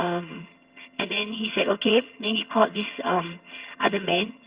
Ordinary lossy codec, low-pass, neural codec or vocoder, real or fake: Opus, 64 kbps; 3.6 kHz; vocoder, 22.05 kHz, 80 mel bands, HiFi-GAN; fake